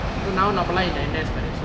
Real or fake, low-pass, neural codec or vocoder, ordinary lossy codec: real; none; none; none